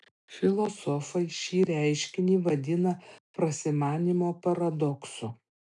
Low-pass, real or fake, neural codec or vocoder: 10.8 kHz; real; none